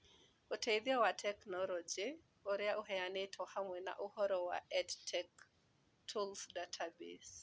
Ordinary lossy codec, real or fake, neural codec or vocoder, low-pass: none; real; none; none